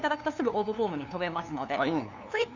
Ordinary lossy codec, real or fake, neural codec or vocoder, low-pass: none; fake; codec, 16 kHz, 2 kbps, FunCodec, trained on LibriTTS, 25 frames a second; 7.2 kHz